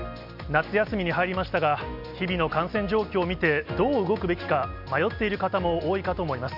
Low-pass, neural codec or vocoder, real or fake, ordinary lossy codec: 5.4 kHz; none; real; none